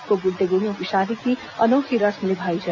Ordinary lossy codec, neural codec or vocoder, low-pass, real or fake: none; none; 7.2 kHz; real